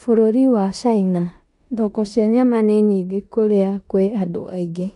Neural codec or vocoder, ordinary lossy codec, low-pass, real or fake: codec, 16 kHz in and 24 kHz out, 0.9 kbps, LongCat-Audio-Codec, four codebook decoder; none; 10.8 kHz; fake